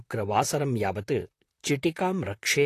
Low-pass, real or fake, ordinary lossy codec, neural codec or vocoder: 14.4 kHz; fake; AAC, 48 kbps; vocoder, 44.1 kHz, 128 mel bands, Pupu-Vocoder